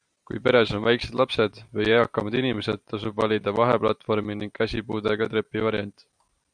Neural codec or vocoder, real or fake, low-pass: vocoder, 44.1 kHz, 128 mel bands every 512 samples, BigVGAN v2; fake; 9.9 kHz